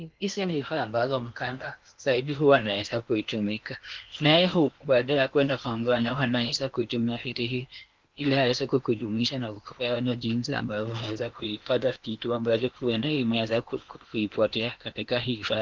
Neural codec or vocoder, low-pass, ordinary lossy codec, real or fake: codec, 16 kHz in and 24 kHz out, 0.8 kbps, FocalCodec, streaming, 65536 codes; 7.2 kHz; Opus, 24 kbps; fake